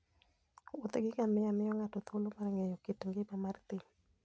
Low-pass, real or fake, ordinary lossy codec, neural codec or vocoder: none; real; none; none